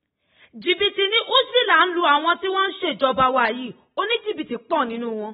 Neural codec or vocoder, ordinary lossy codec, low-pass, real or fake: none; AAC, 16 kbps; 10.8 kHz; real